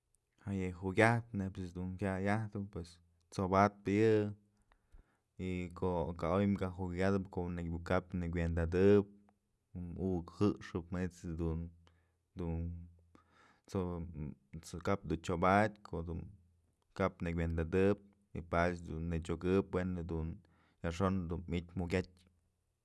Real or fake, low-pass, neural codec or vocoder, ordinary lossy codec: real; none; none; none